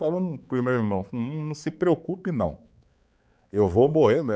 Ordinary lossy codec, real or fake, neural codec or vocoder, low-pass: none; fake; codec, 16 kHz, 4 kbps, X-Codec, HuBERT features, trained on balanced general audio; none